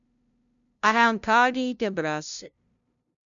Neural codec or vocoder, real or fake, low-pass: codec, 16 kHz, 0.5 kbps, FunCodec, trained on LibriTTS, 25 frames a second; fake; 7.2 kHz